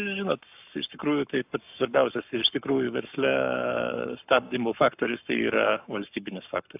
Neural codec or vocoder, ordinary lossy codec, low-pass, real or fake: codec, 44.1 kHz, 7.8 kbps, Pupu-Codec; AAC, 32 kbps; 3.6 kHz; fake